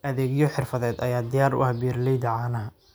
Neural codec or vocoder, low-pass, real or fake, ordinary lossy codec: none; none; real; none